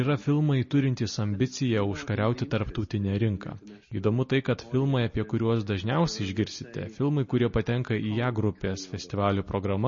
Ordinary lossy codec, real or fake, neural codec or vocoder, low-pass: MP3, 32 kbps; real; none; 7.2 kHz